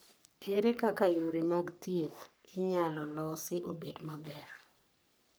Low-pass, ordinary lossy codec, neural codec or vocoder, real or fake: none; none; codec, 44.1 kHz, 3.4 kbps, Pupu-Codec; fake